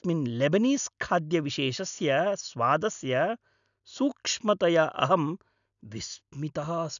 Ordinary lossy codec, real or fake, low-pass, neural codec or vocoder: none; real; 7.2 kHz; none